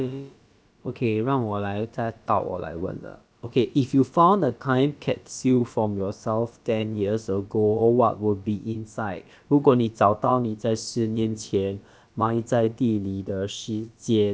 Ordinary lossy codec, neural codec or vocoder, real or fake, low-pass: none; codec, 16 kHz, about 1 kbps, DyCAST, with the encoder's durations; fake; none